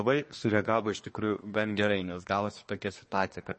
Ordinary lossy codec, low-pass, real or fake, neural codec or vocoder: MP3, 32 kbps; 10.8 kHz; fake; codec, 24 kHz, 1 kbps, SNAC